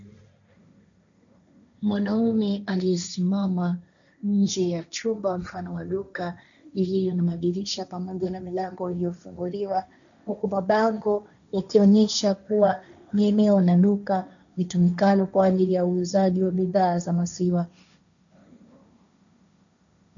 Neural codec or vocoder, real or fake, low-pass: codec, 16 kHz, 1.1 kbps, Voila-Tokenizer; fake; 7.2 kHz